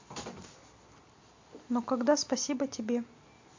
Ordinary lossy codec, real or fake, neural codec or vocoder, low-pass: MP3, 48 kbps; real; none; 7.2 kHz